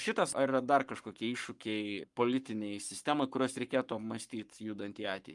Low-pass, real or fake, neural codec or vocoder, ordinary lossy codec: 10.8 kHz; fake; codec, 44.1 kHz, 7.8 kbps, Pupu-Codec; Opus, 32 kbps